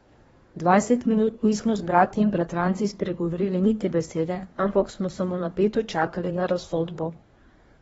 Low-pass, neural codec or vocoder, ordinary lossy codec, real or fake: 10.8 kHz; codec, 24 kHz, 1 kbps, SNAC; AAC, 24 kbps; fake